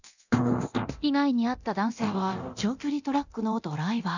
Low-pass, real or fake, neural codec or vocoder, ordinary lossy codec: 7.2 kHz; fake; codec, 24 kHz, 0.9 kbps, DualCodec; none